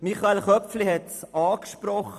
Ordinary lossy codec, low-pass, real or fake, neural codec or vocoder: none; 14.4 kHz; fake; vocoder, 44.1 kHz, 128 mel bands every 256 samples, BigVGAN v2